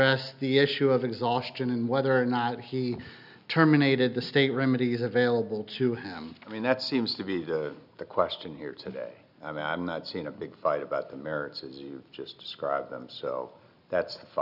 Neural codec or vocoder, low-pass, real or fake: none; 5.4 kHz; real